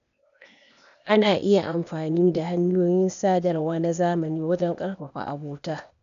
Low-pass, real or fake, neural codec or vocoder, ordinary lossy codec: 7.2 kHz; fake; codec, 16 kHz, 0.8 kbps, ZipCodec; none